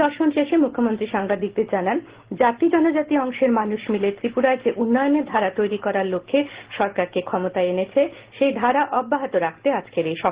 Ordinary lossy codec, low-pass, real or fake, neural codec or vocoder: Opus, 16 kbps; 3.6 kHz; real; none